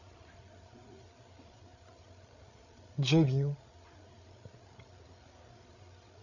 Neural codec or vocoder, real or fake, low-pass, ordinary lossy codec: codec, 16 kHz, 8 kbps, FreqCodec, larger model; fake; 7.2 kHz; none